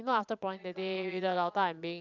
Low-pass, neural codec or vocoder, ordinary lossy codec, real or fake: 7.2 kHz; vocoder, 22.05 kHz, 80 mel bands, Vocos; Opus, 64 kbps; fake